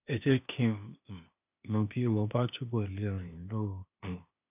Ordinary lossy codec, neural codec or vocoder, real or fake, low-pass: none; codec, 16 kHz, 0.8 kbps, ZipCodec; fake; 3.6 kHz